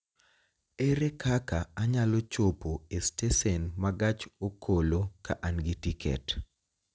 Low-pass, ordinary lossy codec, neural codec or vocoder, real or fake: none; none; none; real